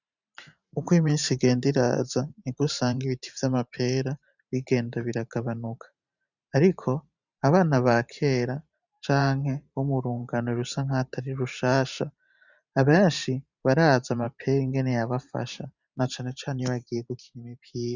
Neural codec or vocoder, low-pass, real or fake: vocoder, 44.1 kHz, 128 mel bands every 512 samples, BigVGAN v2; 7.2 kHz; fake